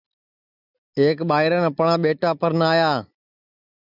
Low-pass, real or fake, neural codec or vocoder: 5.4 kHz; real; none